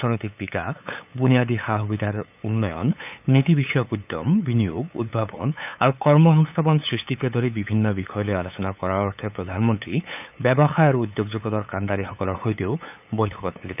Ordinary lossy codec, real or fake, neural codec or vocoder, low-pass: none; fake; codec, 16 kHz, 8 kbps, FunCodec, trained on LibriTTS, 25 frames a second; 3.6 kHz